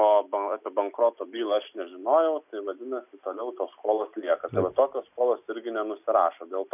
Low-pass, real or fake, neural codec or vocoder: 3.6 kHz; real; none